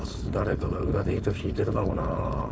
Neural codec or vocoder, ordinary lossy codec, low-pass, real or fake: codec, 16 kHz, 4.8 kbps, FACodec; none; none; fake